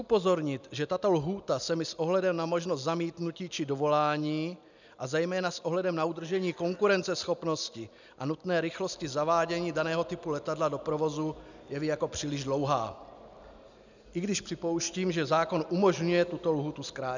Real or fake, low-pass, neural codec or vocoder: real; 7.2 kHz; none